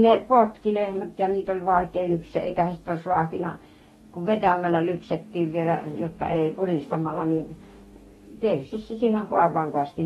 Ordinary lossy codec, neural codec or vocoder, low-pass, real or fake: AAC, 32 kbps; codec, 44.1 kHz, 2.6 kbps, DAC; 19.8 kHz; fake